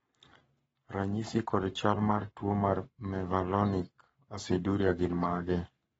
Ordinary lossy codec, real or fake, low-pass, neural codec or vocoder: AAC, 24 kbps; fake; 19.8 kHz; codec, 44.1 kHz, 7.8 kbps, Pupu-Codec